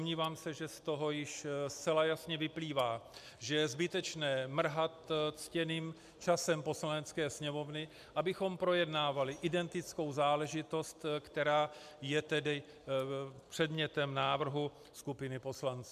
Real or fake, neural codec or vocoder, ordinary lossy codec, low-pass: real; none; MP3, 96 kbps; 14.4 kHz